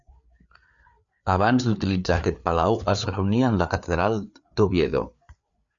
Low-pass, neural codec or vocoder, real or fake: 7.2 kHz; codec, 16 kHz, 4 kbps, FreqCodec, larger model; fake